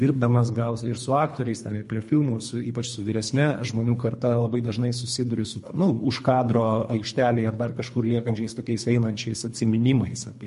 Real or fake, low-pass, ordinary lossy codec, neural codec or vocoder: fake; 10.8 kHz; MP3, 48 kbps; codec, 24 kHz, 3 kbps, HILCodec